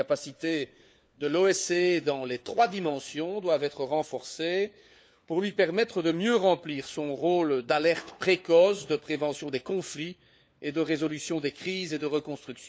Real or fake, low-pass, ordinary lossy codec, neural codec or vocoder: fake; none; none; codec, 16 kHz, 4 kbps, FunCodec, trained on LibriTTS, 50 frames a second